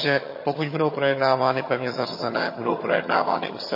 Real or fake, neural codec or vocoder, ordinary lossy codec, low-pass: fake; vocoder, 22.05 kHz, 80 mel bands, HiFi-GAN; MP3, 24 kbps; 5.4 kHz